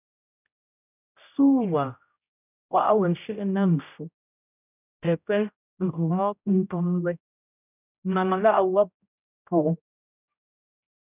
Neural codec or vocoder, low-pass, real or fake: codec, 16 kHz, 0.5 kbps, X-Codec, HuBERT features, trained on general audio; 3.6 kHz; fake